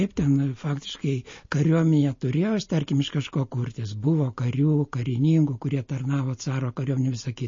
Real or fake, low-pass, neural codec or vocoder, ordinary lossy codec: real; 7.2 kHz; none; MP3, 32 kbps